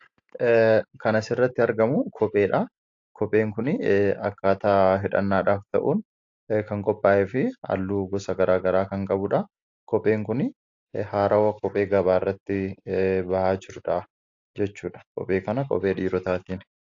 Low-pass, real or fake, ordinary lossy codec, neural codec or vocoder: 7.2 kHz; real; AAC, 48 kbps; none